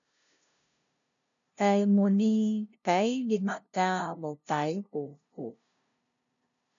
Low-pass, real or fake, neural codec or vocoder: 7.2 kHz; fake; codec, 16 kHz, 0.5 kbps, FunCodec, trained on LibriTTS, 25 frames a second